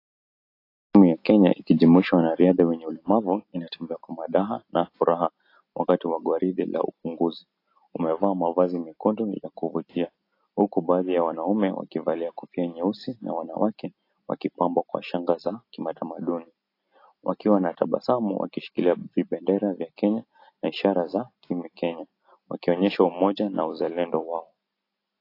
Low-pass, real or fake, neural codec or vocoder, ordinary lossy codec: 5.4 kHz; real; none; AAC, 32 kbps